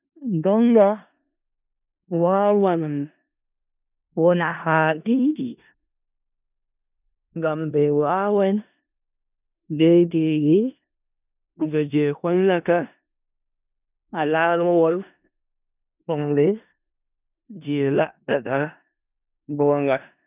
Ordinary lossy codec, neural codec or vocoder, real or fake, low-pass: none; codec, 16 kHz in and 24 kHz out, 0.4 kbps, LongCat-Audio-Codec, four codebook decoder; fake; 3.6 kHz